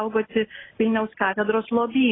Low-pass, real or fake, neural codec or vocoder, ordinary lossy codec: 7.2 kHz; real; none; AAC, 16 kbps